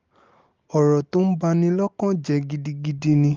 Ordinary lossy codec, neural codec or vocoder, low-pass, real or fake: Opus, 32 kbps; none; 7.2 kHz; real